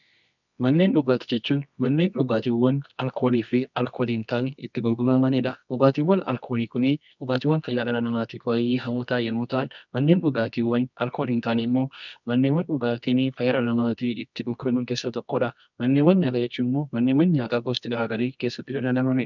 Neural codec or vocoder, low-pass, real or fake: codec, 24 kHz, 0.9 kbps, WavTokenizer, medium music audio release; 7.2 kHz; fake